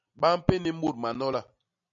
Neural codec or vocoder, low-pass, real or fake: none; 7.2 kHz; real